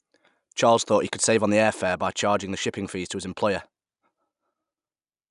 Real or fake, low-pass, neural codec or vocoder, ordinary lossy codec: real; 10.8 kHz; none; none